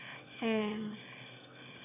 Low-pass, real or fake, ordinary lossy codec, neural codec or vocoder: 3.6 kHz; fake; none; autoencoder, 22.05 kHz, a latent of 192 numbers a frame, VITS, trained on one speaker